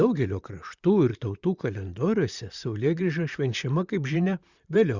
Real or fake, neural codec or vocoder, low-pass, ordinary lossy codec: fake; vocoder, 44.1 kHz, 128 mel bands, Pupu-Vocoder; 7.2 kHz; Opus, 64 kbps